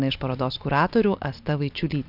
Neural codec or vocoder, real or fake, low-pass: none; real; 5.4 kHz